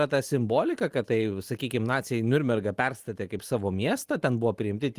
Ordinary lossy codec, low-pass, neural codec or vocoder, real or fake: Opus, 24 kbps; 14.4 kHz; none; real